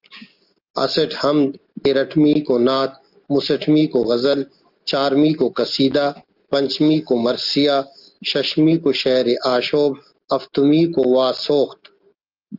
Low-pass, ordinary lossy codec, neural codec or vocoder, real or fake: 5.4 kHz; Opus, 24 kbps; none; real